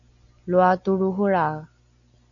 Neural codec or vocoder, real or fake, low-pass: none; real; 7.2 kHz